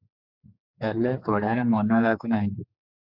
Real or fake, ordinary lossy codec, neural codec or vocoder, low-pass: fake; AAC, 48 kbps; codec, 16 kHz, 4 kbps, X-Codec, HuBERT features, trained on general audio; 5.4 kHz